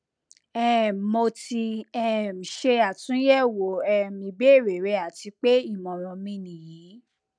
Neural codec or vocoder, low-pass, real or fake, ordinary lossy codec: none; 9.9 kHz; real; none